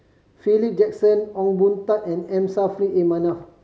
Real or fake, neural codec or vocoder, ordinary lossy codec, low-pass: real; none; none; none